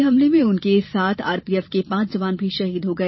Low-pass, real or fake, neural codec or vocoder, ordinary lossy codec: 7.2 kHz; fake; vocoder, 44.1 kHz, 128 mel bands every 512 samples, BigVGAN v2; MP3, 24 kbps